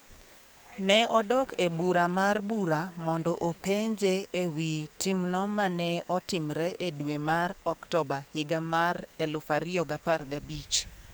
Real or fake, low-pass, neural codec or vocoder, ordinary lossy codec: fake; none; codec, 44.1 kHz, 2.6 kbps, SNAC; none